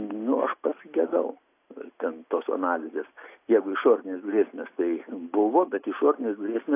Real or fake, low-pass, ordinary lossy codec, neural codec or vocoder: real; 3.6 kHz; AAC, 24 kbps; none